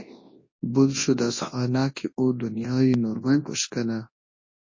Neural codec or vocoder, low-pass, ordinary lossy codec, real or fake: codec, 24 kHz, 0.9 kbps, WavTokenizer, large speech release; 7.2 kHz; MP3, 32 kbps; fake